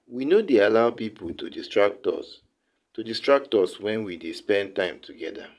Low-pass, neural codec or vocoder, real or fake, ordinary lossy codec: none; vocoder, 22.05 kHz, 80 mel bands, Vocos; fake; none